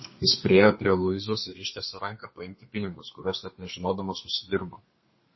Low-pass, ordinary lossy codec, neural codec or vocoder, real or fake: 7.2 kHz; MP3, 24 kbps; codec, 32 kHz, 1.9 kbps, SNAC; fake